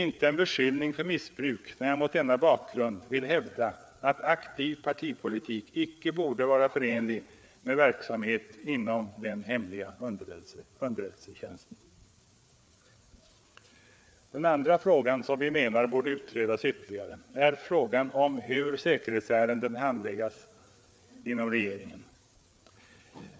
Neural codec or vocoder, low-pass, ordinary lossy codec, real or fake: codec, 16 kHz, 4 kbps, FreqCodec, larger model; none; none; fake